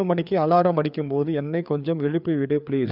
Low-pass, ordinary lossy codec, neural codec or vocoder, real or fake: 5.4 kHz; none; codec, 16 kHz, 4 kbps, FreqCodec, larger model; fake